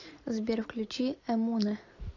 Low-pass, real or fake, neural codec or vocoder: 7.2 kHz; real; none